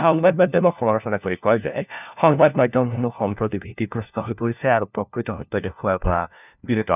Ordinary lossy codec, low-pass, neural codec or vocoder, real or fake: none; 3.6 kHz; codec, 16 kHz, 1 kbps, FunCodec, trained on LibriTTS, 50 frames a second; fake